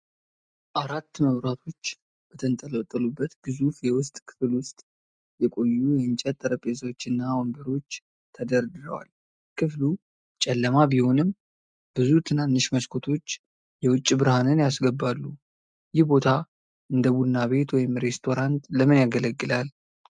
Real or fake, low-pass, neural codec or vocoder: real; 9.9 kHz; none